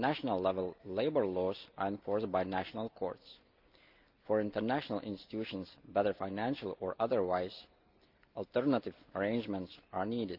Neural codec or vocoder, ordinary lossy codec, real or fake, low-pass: none; Opus, 24 kbps; real; 5.4 kHz